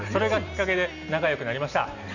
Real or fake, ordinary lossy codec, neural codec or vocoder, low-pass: real; none; none; 7.2 kHz